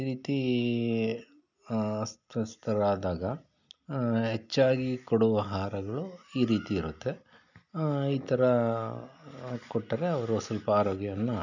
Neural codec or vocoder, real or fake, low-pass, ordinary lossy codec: none; real; 7.2 kHz; none